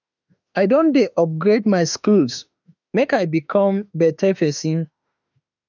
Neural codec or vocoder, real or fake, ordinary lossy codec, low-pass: autoencoder, 48 kHz, 32 numbers a frame, DAC-VAE, trained on Japanese speech; fake; none; 7.2 kHz